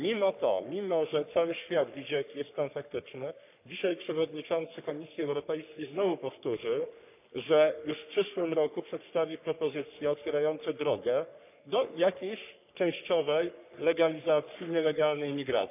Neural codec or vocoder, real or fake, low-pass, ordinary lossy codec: codec, 44.1 kHz, 3.4 kbps, Pupu-Codec; fake; 3.6 kHz; none